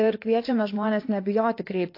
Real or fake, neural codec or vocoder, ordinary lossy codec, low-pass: fake; codec, 16 kHz in and 24 kHz out, 2.2 kbps, FireRedTTS-2 codec; AAC, 32 kbps; 5.4 kHz